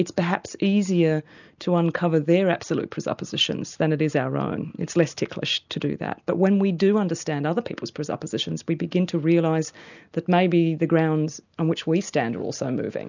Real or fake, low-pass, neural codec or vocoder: real; 7.2 kHz; none